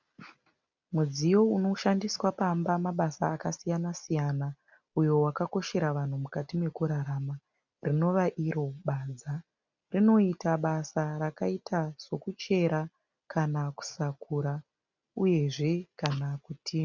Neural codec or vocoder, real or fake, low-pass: none; real; 7.2 kHz